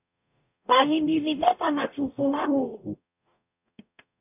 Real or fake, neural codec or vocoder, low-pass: fake; codec, 44.1 kHz, 0.9 kbps, DAC; 3.6 kHz